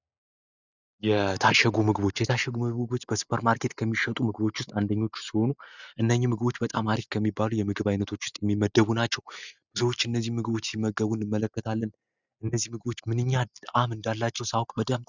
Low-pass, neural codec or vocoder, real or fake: 7.2 kHz; none; real